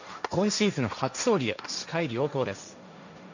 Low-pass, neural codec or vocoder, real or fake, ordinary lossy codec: 7.2 kHz; codec, 16 kHz, 1.1 kbps, Voila-Tokenizer; fake; none